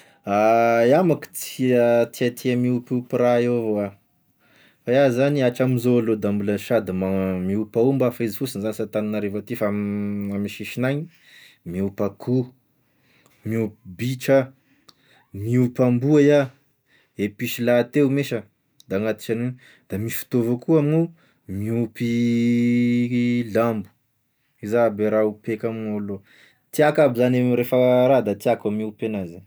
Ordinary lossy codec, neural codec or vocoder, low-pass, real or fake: none; none; none; real